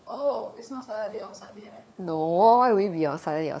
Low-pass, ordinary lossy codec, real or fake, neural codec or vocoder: none; none; fake; codec, 16 kHz, 4 kbps, FunCodec, trained on LibriTTS, 50 frames a second